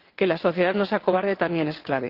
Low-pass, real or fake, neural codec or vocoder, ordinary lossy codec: 5.4 kHz; fake; vocoder, 22.05 kHz, 80 mel bands, WaveNeXt; Opus, 16 kbps